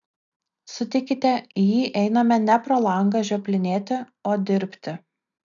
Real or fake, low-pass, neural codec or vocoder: real; 7.2 kHz; none